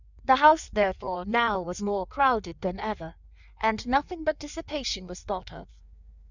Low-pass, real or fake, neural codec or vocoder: 7.2 kHz; fake; codec, 16 kHz in and 24 kHz out, 1.1 kbps, FireRedTTS-2 codec